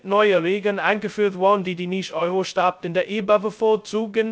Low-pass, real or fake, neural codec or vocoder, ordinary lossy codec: none; fake; codec, 16 kHz, 0.2 kbps, FocalCodec; none